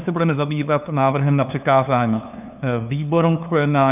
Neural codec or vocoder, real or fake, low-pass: codec, 16 kHz, 2 kbps, FunCodec, trained on LibriTTS, 25 frames a second; fake; 3.6 kHz